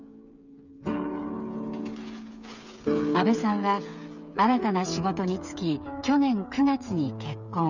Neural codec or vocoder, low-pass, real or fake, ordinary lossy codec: codec, 16 kHz, 8 kbps, FreqCodec, smaller model; 7.2 kHz; fake; none